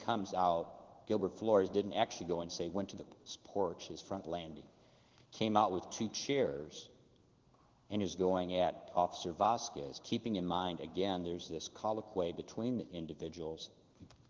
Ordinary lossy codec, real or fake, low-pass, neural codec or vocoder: Opus, 16 kbps; fake; 7.2 kHz; codec, 16 kHz in and 24 kHz out, 1 kbps, XY-Tokenizer